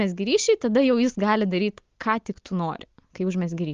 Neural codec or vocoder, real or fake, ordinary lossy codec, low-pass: none; real; Opus, 32 kbps; 7.2 kHz